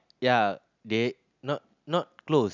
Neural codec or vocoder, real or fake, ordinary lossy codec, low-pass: none; real; none; 7.2 kHz